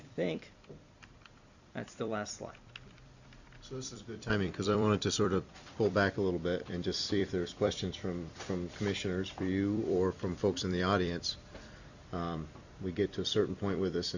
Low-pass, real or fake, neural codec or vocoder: 7.2 kHz; fake; vocoder, 44.1 kHz, 128 mel bands every 256 samples, BigVGAN v2